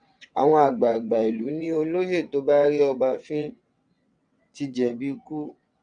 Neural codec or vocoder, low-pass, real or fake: vocoder, 22.05 kHz, 80 mel bands, WaveNeXt; 9.9 kHz; fake